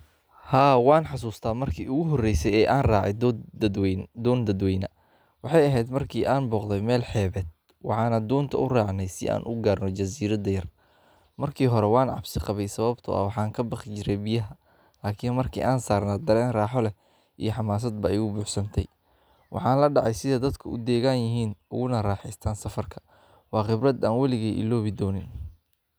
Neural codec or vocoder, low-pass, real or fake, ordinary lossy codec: none; none; real; none